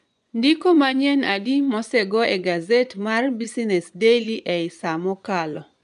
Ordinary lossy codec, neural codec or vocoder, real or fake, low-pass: none; vocoder, 24 kHz, 100 mel bands, Vocos; fake; 10.8 kHz